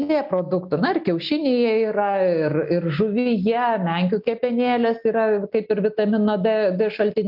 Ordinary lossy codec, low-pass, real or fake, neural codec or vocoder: AAC, 48 kbps; 5.4 kHz; real; none